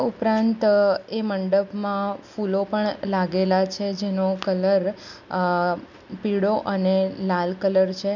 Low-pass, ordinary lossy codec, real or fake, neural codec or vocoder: 7.2 kHz; none; real; none